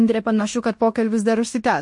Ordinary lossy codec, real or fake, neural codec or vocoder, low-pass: MP3, 48 kbps; fake; codec, 16 kHz in and 24 kHz out, 0.9 kbps, LongCat-Audio-Codec, fine tuned four codebook decoder; 10.8 kHz